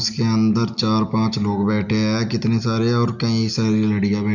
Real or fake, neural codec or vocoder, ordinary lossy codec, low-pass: real; none; none; 7.2 kHz